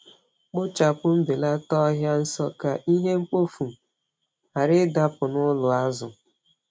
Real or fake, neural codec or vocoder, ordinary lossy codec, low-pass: real; none; none; none